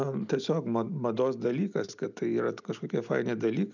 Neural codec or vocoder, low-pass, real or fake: none; 7.2 kHz; real